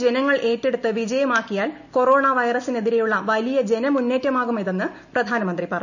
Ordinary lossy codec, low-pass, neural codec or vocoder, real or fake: none; 7.2 kHz; none; real